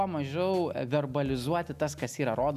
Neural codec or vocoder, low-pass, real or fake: none; 14.4 kHz; real